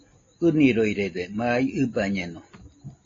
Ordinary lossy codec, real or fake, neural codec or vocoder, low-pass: AAC, 32 kbps; real; none; 7.2 kHz